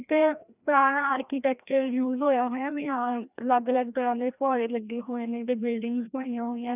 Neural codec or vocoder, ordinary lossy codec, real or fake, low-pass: codec, 16 kHz, 1 kbps, FreqCodec, larger model; none; fake; 3.6 kHz